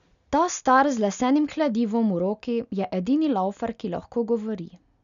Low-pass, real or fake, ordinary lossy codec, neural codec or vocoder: 7.2 kHz; real; none; none